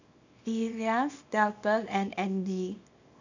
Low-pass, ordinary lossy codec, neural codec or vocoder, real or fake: 7.2 kHz; none; codec, 24 kHz, 0.9 kbps, WavTokenizer, small release; fake